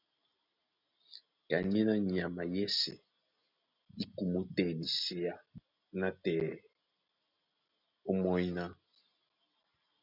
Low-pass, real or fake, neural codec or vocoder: 5.4 kHz; real; none